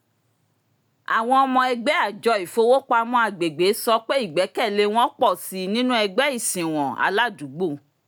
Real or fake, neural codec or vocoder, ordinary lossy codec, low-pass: real; none; none; none